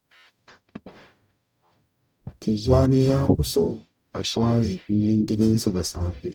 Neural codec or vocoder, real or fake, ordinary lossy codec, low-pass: codec, 44.1 kHz, 0.9 kbps, DAC; fake; none; 19.8 kHz